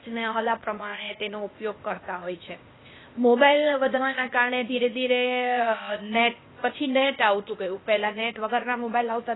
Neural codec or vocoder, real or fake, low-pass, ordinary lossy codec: codec, 16 kHz, 0.8 kbps, ZipCodec; fake; 7.2 kHz; AAC, 16 kbps